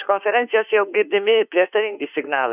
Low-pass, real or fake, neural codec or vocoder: 3.6 kHz; fake; autoencoder, 48 kHz, 32 numbers a frame, DAC-VAE, trained on Japanese speech